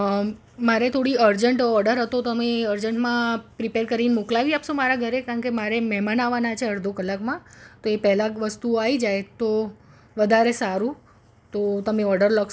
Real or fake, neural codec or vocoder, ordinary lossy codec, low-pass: real; none; none; none